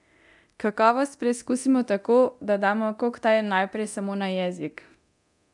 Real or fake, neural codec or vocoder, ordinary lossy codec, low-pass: fake; codec, 24 kHz, 0.9 kbps, DualCodec; none; 10.8 kHz